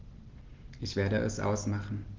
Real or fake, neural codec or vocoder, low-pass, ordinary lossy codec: real; none; 7.2 kHz; Opus, 16 kbps